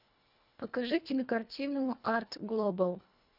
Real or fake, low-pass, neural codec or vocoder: fake; 5.4 kHz; codec, 24 kHz, 1.5 kbps, HILCodec